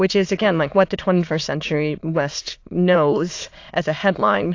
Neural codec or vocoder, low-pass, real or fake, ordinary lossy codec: autoencoder, 22.05 kHz, a latent of 192 numbers a frame, VITS, trained on many speakers; 7.2 kHz; fake; AAC, 48 kbps